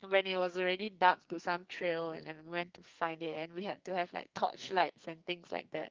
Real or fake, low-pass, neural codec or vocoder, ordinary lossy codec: fake; 7.2 kHz; codec, 44.1 kHz, 2.6 kbps, SNAC; Opus, 24 kbps